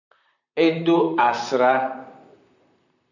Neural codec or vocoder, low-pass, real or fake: vocoder, 44.1 kHz, 128 mel bands, Pupu-Vocoder; 7.2 kHz; fake